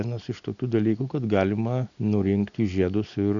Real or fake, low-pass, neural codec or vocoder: real; 7.2 kHz; none